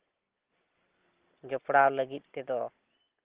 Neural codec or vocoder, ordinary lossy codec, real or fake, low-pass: none; Opus, 16 kbps; real; 3.6 kHz